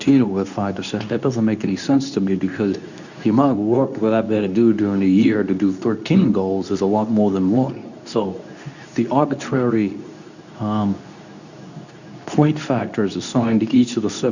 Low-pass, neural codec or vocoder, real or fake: 7.2 kHz; codec, 24 kHz, 0.9 kbps, WavTokenizer, medium speech release version 2; fake